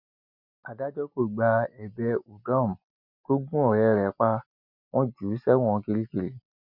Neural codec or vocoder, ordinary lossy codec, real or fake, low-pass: none; none; real; 5.4 kHz